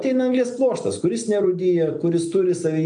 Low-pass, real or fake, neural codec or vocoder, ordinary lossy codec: 9.9 kHz; real; none; AAC, 64 kbps